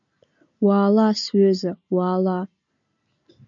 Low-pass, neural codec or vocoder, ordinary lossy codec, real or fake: 7.2 kHz; none; MP3, 64 kbps; real